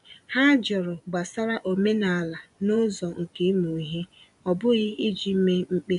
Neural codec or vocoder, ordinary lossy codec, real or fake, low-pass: vocoder, 24 kHz, 100 mel bands, Vocos; none; fake; 10.8 kHz